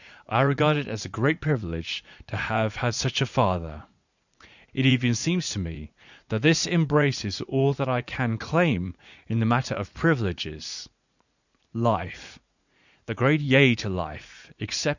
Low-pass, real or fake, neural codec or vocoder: 7.2 kHz; fake; vocoder, 44.1 kHz, 80 mel bands, Vocos